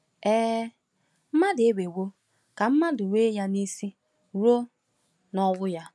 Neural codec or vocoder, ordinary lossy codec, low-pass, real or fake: none; none; none; real